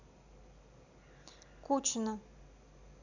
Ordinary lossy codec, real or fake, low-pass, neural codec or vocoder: none; real; 7.2 kHz; none